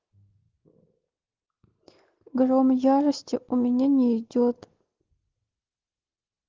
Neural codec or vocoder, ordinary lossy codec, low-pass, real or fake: none; Opus, 16 kbps; 7.2 kHz; real